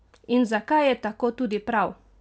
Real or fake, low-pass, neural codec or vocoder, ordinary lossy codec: real; none; none; none